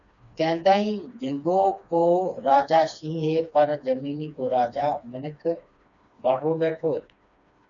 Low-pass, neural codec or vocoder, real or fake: 7.2 kHz; codec, 16 kHz, 2 kbps, FreqCodec, smaller model; fake